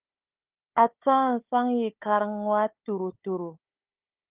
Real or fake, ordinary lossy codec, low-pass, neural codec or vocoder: fake; Opus, 32 kbps; 3.6 kHz; codec, 16 kHz, 4 kbps, FunCodec, trained on Chinese and English, 50 frames a second